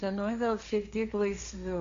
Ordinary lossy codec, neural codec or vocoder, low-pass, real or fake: Opus, 64 kbps; codec, 16 kHz, 1.1 kbps, Voila-Tokenizer; 7.2 kHz; fake